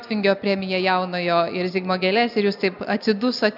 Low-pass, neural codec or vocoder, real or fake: 5.4 kHz; none; real